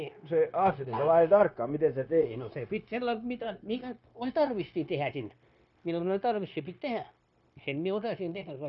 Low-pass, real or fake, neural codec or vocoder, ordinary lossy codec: 7.2 kHz; fake; codec, 16 kHz, 0.9 kbps, LongCat-Audio-Codec; none